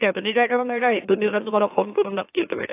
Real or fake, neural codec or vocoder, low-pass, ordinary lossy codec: fake; autoencoder, 44.1 kHz, a latent of 192 numbers a frame, MeloTTS; 3.6 kHz; AAC, 24 kbps